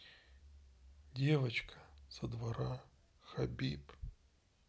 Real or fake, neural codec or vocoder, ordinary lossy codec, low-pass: real; none; none; none